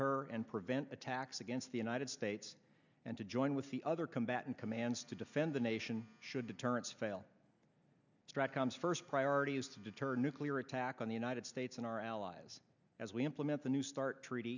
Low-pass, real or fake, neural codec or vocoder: 7.2 kHz; real; none